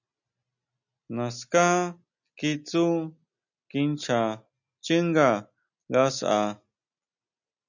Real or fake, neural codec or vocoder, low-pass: real; none; 7.2 kHz